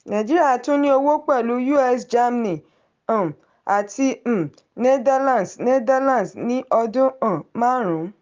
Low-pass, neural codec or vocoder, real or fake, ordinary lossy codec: 7.2 kHz; none; real; Opus, 32 kbps